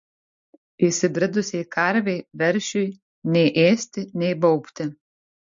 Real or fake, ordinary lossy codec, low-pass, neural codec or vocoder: real; MP3, 48 kbps; 7.2 kHz; none